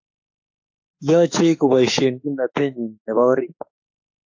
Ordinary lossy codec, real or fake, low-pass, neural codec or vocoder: AAC, 48 kbps; fake; 7.2 kHz; autoencoder, 48 kHz, 32 numbers a frame, DAC-VAE, trained on Japanese speech